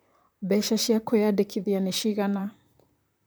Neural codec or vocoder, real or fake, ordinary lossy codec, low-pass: vocoder, 44.1 kHz, 128 mel bands, Pupu-Vocoder; fake; none; none